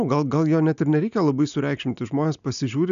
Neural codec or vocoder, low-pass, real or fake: none; 7.2 kHz; real